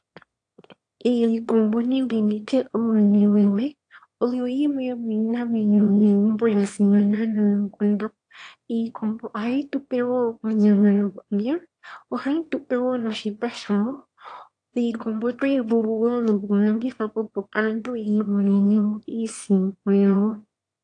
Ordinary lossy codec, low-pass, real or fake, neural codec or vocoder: AAC, 48 kbps; 9.9 kHz; fake; autoencoder, 22.05 kHz, a latent of 192 numbers a frame, VITS, trained on one speaker